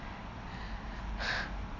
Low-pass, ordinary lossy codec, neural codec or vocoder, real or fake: 7.2 kHz; none; none; real